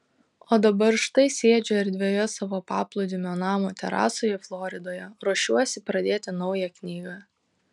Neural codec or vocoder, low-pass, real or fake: none; 10.8 kHz; real